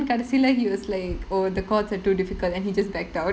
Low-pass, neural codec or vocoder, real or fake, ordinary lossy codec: none; none; real; none